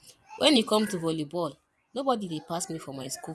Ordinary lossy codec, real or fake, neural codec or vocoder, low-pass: none; fake; vocoder, 24 kHz, 100 mel bands, Vocos; none